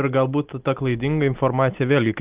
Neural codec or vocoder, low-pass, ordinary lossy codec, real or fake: none; 3.6 kHz; Opus, 32 kbps; real